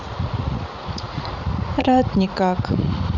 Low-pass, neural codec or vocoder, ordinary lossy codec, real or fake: 7.2 kHz; vocoder, 44.1 kHz, 80 mel bands, Vocos; none; fake